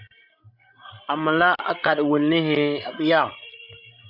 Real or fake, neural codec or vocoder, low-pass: fake; codec, 16 kHz, 16 kbps, FreqCodec, larger model; 5.4 kHz